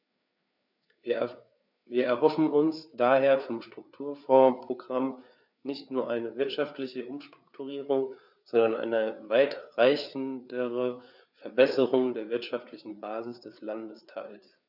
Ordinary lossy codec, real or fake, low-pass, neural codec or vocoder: none; fake; 5.4 kHz; codec, 16 kHz, 4 kbps, FreqCodec, larger model